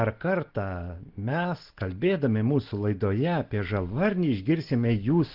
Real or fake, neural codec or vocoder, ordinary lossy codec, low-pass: real; none; Opus, 16 kbps; 5.4 kHz